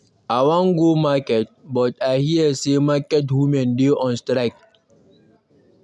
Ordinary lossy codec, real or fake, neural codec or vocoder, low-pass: none; real; none; none